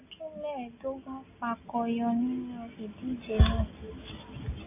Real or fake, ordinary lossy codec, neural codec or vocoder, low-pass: real; AAC, 24 kbps; none; 3.6 kHz